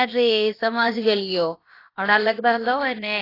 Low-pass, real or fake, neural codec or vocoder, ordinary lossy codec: 5.4 kHz; fake; codec, 16 kHz, about 1 kbps, DyCAST, with the encoder's durations; AAC, 24 kbps